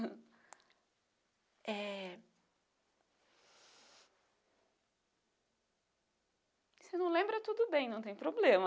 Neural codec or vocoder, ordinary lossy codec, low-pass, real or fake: none; none; none; real